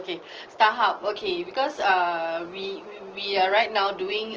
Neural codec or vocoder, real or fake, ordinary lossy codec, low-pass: none; real; Opus, 16 kbps; 7.2 kHz